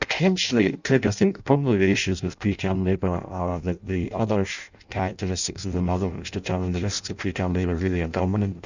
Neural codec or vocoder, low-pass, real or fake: codec, 16 kHz in and 24 kHz out, 0.6 kbps, FireRedTTS-2 codec; 7.2 kHz; fake